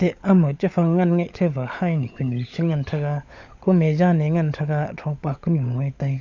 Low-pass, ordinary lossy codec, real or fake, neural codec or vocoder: 7.2 kHz; AAC, 48 kbps; fake; codec, 16 kHz, 16 kbps, FunCodec, trained on LibriTTS, 50 frames a second